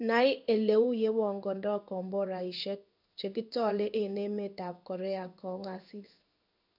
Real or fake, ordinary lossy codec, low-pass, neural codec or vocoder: fake; none; 5.4 kHz; codec, 16 kHz in and 24 kHz out, 1 kbps, XY-Tokenizer